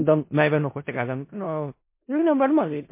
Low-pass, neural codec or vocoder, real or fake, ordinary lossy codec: 3.6 kHz; codec, 16 kHz in and 24 kHz out, 0.4 kbps, LongCat-Audio-Codec, four codebook decoder; fake; MP3, 24 kbps